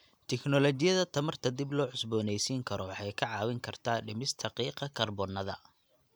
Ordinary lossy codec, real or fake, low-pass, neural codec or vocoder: none; real; none; none